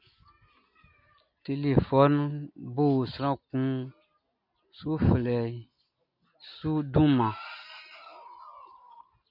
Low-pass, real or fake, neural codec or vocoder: 5.4 kHz; real; none